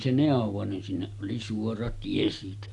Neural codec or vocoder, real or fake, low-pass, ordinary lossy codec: none; real; 10.8 kHz; none